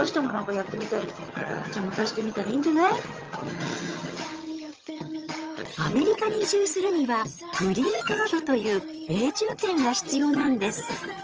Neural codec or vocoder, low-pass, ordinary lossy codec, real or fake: vocoder, 22.05 kHz, 80 mel bands, HiFi-GAN; 7.2 kHz; Opus, 24 kbps; fake